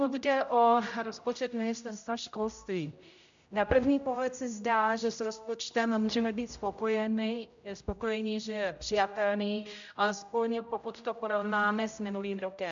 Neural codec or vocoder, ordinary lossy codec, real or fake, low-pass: codec, 16 kHz, 0.5 kbps, X-Codec, HuBERT features, trained on general audio; AAC, 64 kbps; fake; 7.2 kHz